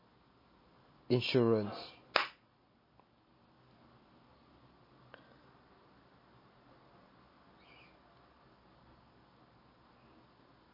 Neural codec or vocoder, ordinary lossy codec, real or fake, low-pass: none; MP3, 24 kbps; real; 5.4 kHz